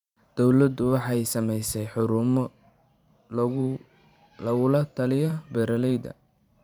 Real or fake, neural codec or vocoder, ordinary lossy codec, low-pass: fake; vocoder, 44.1 kHz, 128 mel bands every 512 samples, BigVGAN v2; none; 19.8 kHz